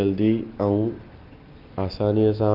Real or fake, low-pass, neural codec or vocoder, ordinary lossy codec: real; 5.4 kHz; none; Opus, 32 kbps